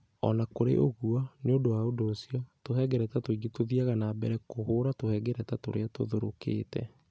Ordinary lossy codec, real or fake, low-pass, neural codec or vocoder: none; real; none; none